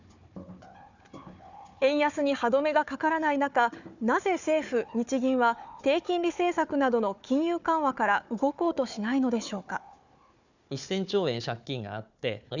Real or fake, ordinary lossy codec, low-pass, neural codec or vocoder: fake; none; 7.2 kHz; codec, 16 kHz, 4 kbps, FunCodec, trained on Chinese and English, 50 frames a second